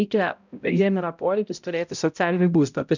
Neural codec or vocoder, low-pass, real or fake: codec, 16 kHz, 0.5 kbps, X-Codec, HuBERT features, trained on balanced general audio; 7.2 kHz; fake